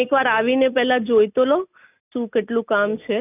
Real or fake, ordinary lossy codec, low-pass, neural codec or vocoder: real; none; 3.6 kHz; none